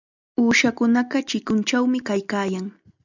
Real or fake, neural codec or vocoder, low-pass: real; none; 7.2 kHz